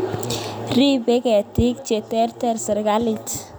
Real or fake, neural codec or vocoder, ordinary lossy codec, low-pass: real; none; none; none